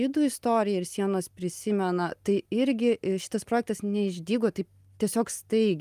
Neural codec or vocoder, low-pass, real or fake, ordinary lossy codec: none; 14.4 kHz; real; Opus, 32 kbps